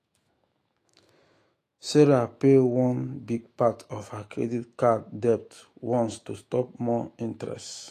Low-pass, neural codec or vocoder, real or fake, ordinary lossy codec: 14.4 kHz; autoencoder, 48 kHz, 128 numbers a frame, DAC-VAE, trained on Japanese speech; fake; AAC, 48 kbps